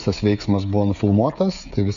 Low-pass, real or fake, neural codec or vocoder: 7.2 kHz; fake; codec, 16 kHz, 16 kbps, FreqCodec, smaller model